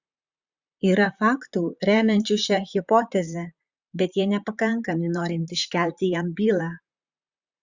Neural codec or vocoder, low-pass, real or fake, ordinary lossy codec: vocoder, 44.1 kHz, 128 mel bands, Pupu-Vocoder; 7.2 kHz; fake; Opus, 64 kbps